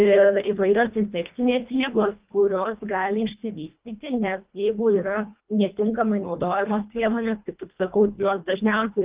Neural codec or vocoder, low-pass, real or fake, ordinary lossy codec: codec, 24 kHz, 1.5 kbps, HILCodec; 3.6 kHz; fake; Opus, 32 kbps